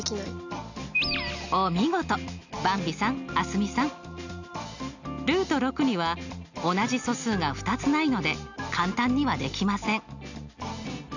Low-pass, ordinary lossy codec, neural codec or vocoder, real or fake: 7.2 kHz; none; none; real